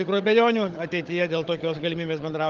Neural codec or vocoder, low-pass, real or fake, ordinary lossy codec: codec, 16 kHz, 16 kbps, FunCodec, trained on Chinese and English, 50 frames a second; 7.2 kHz; fake; Opus, 32 kbps